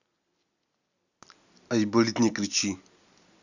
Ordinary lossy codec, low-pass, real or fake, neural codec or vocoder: none; 7.2 kHz; real; none